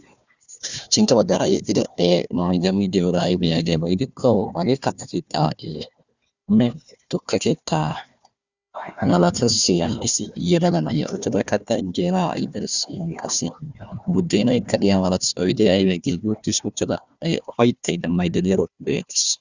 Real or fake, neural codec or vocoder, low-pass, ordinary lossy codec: fake; codec, 16 kHz, 1 kbps, FunCodec, trained on Chinese and English, 50 frames a second; 7.2 kHz; Opus, 64 kbps